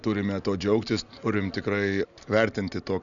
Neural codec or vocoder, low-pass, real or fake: none; 7.2 kHz; real